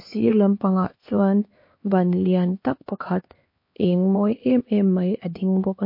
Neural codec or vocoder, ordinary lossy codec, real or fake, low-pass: codec, 16 kHz, 2 kbps, X-Codec, HuBERT features, trained on LibriSpeech; MP3, 32 kbps; fake; 5.4 kHz